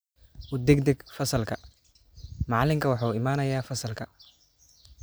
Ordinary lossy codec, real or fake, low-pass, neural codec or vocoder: none; real; none; none